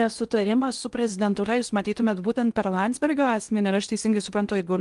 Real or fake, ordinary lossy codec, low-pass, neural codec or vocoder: fake; Opus, 32 kbps; 10.8 kHz; codec, 16 kHz in and 24 kHz out, 0.8 kbps, FocalCodec, streaming, 65536 codes